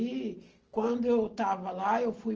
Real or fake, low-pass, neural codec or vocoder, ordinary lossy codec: real; 7.2 kHz; none; Opus, 16 kbps